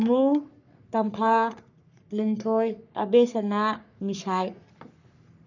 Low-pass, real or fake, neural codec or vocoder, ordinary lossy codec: 7.2 kHz; fake; codec, 44.1 kHz, 3.4 kbps, Pupu-Codec; none